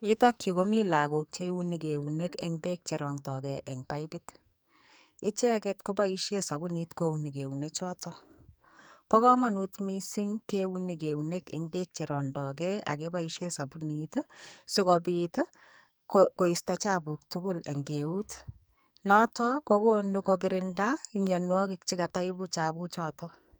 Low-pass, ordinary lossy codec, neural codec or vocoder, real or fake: none; none; codec, 44.1 kHz, 2.6 kbps, SNAC; fake